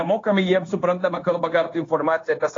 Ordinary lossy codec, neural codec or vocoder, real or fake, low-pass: AAC, 32 kbps; codec, 16 kHz, 0.9 kbps, LongCat-Audio-Codec; fake; 7.2 kHz